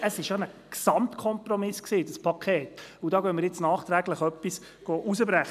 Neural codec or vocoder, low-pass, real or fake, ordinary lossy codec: none; 14.4 kHz; real; none